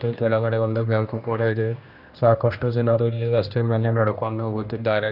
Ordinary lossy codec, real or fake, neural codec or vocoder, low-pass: none; fake; codec, 16 kHz, 1 kbps, X-Codec, HuBERT features, trained on general audio; 5.4 kHz